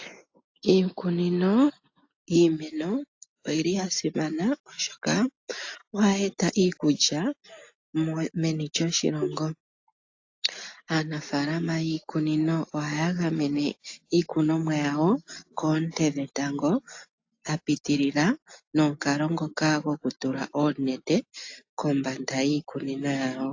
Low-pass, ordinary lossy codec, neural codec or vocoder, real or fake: 7.2 kHz; AAC, 48 kbps; none; real